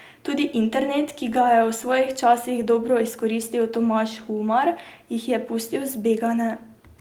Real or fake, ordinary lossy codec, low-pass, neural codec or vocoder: real; Opus, 24 kbps; 19.8 kHz; none